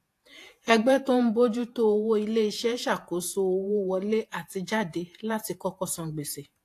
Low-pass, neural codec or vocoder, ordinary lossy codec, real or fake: 14.4 kHz; vocoder, 48 kHz, 128 mel bands, Vocos; AAC, 64 kbps; fake